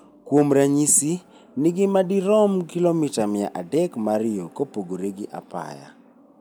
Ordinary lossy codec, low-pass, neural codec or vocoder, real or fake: none; none; none; real